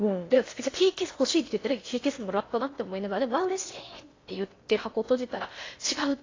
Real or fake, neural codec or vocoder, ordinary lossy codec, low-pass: fake; codec, 16 kHz in and 24 kHz out, 0.8 kbps, FocalCodec, streaming, 65536 codes; AAC, 32 kbps; 7.2 kHz